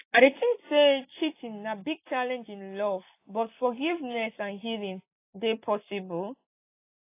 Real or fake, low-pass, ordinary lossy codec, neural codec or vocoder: real; 3.6 kHz; AAC, 24 kbps; none